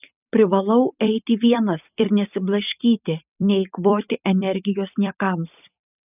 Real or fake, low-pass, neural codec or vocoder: fake; 3.6 kHz; vocoder, 44.1 kHz, 128 mel bands every 256 samples, BigVGAN v2